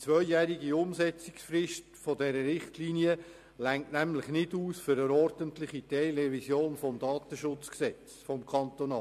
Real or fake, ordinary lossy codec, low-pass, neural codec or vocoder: real; MP3, 64 kbps; 14.4 kHz; none